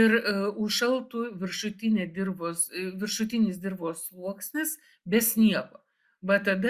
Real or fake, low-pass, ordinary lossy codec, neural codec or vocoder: real; 14.4 kHz; Opus, 64 kbps; none